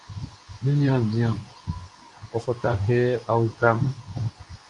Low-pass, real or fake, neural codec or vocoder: 10.8 kHz; fake; codec, 24 kHz, 0.9 kbps, WavTokenizer, medium speech release version 2